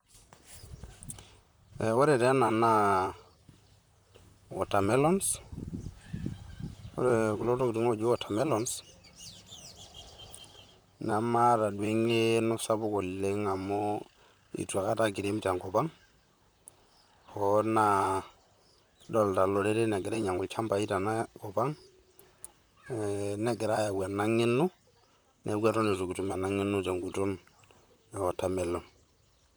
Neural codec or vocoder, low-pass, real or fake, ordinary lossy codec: vocoder, 44.1 kHz, 128 mel bands, Pupu-Vocoder; none; fake; none